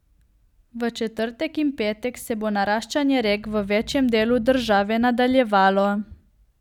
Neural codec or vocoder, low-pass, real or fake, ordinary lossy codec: none; 19.8 kHz; real; none